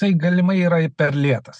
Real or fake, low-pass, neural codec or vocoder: real; 9.9 kHz; none